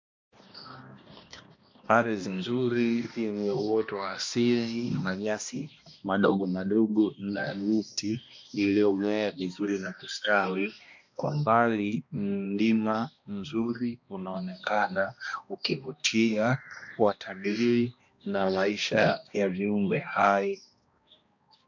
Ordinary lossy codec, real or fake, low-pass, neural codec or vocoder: MP3, 48 kbps; fake; 7.2 kHz; codec, 16 kHz, 1 kbps, X-Codec, HuBERT features, trained on balanced general audio